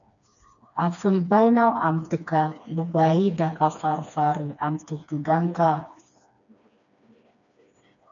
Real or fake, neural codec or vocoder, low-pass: fake; codec, 16 kHz, 2 kbps, FreqCodec, smaller model; 7.2 kHz